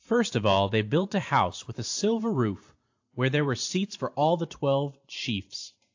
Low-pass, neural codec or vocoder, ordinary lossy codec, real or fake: 7.2 kHz; vocoder, 44.1 kHz, 128 mel bands every 512 samples, BigVGAN v2; AAC, 48 kbps; fake